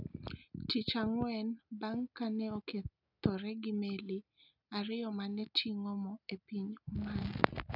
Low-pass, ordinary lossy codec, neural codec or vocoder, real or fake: 5.4 kHz; none; none; real